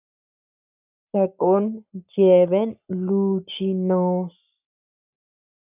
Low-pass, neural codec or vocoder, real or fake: 3.6 kHz; codec, 44.1 kHz, 7.8 kbps, Pupu-Codec; fake